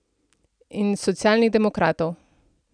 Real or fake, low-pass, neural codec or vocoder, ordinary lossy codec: real; 9.9 kHz; none; none